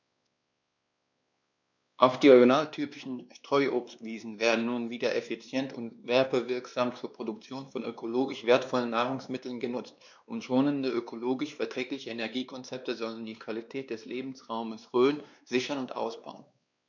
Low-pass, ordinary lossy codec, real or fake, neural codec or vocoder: 7.2 kHz; none; fake; codec, 16 kHz, 2 kbps, X-Codec, WavLM features, trained on Multilingual LibriSpeech